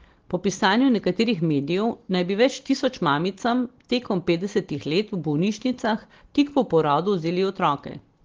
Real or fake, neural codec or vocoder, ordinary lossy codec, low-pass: real; none; Opus, 16 kbps; 7.2 kHz